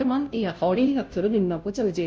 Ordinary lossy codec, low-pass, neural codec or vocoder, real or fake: none; none; codec, 16 kHz, 0.5 kbps, FunCodec, trained on Chinese and English, 25 frames a second; fake